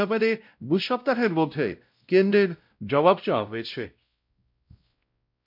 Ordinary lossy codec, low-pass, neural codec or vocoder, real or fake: MP3, 48 kbps; 5.4 kHz; codec, 16 kHz, 0.5 kbps, X-Codec, WavLM features, trained on Multilingual LibriSpeech; fake